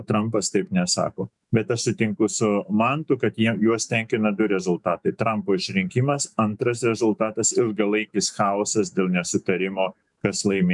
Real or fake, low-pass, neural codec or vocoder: fake; 10.8 kHz; autoencoder, 48 kHz, 128 numbers a frame, DAC-VAE, trained on Japanese speech